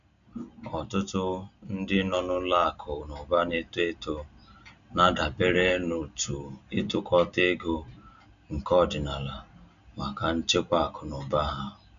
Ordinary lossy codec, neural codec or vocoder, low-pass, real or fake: none; none; 7.2 kHz; real